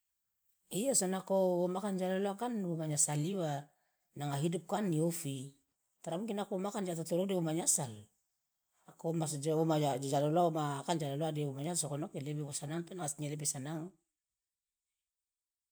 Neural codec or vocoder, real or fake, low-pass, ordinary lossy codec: vocoder, 44.1 kHz, 128 mel bands every 512 samples, BigVGAN v2; fake; none; none